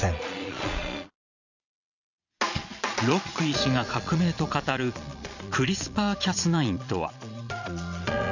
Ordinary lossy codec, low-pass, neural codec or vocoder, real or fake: none; 7.2 kHz; vocoder, 22.05 kHz, 80 mel bands, Vocos; fake